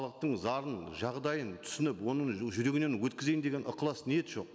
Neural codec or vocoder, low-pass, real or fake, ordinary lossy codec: none; none; real; none